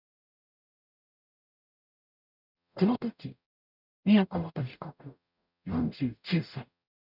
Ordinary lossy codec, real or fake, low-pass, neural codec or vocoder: none; fake; 5.4 kHz; codec, 44.1 kHz, 0.9 kbps, DAC